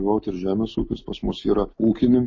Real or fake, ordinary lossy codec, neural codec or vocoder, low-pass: real; MP3, 32 kbps; none; 7.2 kHz